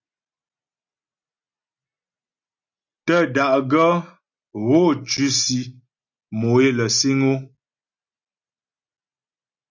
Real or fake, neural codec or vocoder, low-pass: real; none; 7.2 kHz